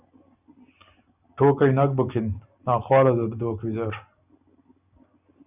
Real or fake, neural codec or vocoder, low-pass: real; none; 3.6 kHz